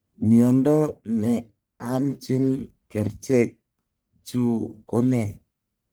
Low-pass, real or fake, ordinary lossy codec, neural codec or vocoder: none; fake; none; codec, 44.1 kHz, 1.7 kbps, Pupu-Codec